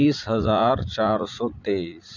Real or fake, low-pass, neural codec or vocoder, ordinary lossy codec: fake; 7.2 kHz; vocoder, 44.1 kHz, 80 mel bands, Vocos; none